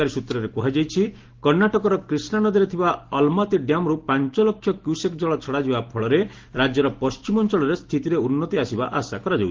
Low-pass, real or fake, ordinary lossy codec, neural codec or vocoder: 7.2 kHz; real; Opus, 16 kbps; none